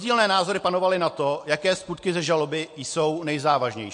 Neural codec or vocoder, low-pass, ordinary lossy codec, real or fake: none; 10.8 kHz; MP3, 48 kbps; real